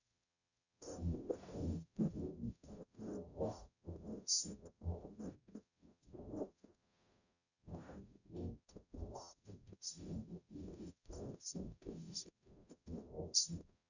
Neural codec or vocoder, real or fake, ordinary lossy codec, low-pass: codec, 44.1 kHz, 0.9 kbps, DAC; fake; none; 7.2 kHz